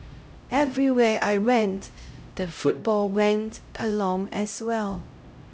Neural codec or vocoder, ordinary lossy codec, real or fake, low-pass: codec, 16 kHz, 0.5 kbps, X-Codec, HuBERT features, trained on LibriSpeech; none; fake; none